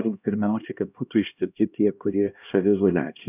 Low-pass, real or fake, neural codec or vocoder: 3.6 kHz; fake; codec, 16 kHz, 1 kbps, X-Codec, HuBERT features, trained on LibriSpeech